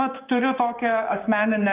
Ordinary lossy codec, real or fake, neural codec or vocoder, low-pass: Opus, 32 kbps; fake; codec, 16 kHz, 6 kbps, DAC; 3.6 kHz